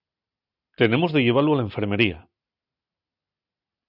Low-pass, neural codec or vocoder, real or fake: 5.4 kHz; none; real